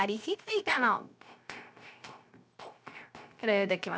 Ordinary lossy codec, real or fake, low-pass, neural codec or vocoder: none; fake; none; codec, 16 kHz, 0.3 kbps, FocalCodec